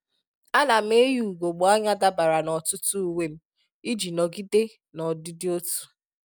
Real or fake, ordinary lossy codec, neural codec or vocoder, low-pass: real; none; none; none